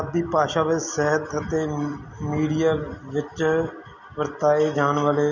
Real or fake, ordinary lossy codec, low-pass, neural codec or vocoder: real; none; 7.2 kHz; none